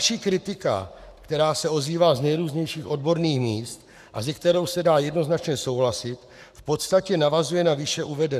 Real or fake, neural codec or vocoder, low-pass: fake; codec, 44.1 kHz, 7.8 kbps, Pupu-Codec; 14.4 kHz